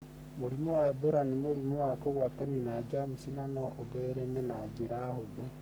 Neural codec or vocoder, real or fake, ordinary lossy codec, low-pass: codec, 44.1 kHz, 3.4 kbps, Pupu-Codec; fake; none; none